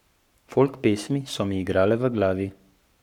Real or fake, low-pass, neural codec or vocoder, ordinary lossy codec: fake; 19.8 kHz; codec, 44.1 kHz, 7.8 kbps, Pupu-Codec; none